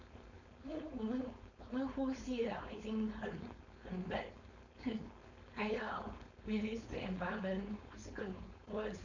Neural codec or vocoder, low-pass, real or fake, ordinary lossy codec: codec, 16 kHz, 4.8 kbps, FACodec; 7.2 kHz; fake; none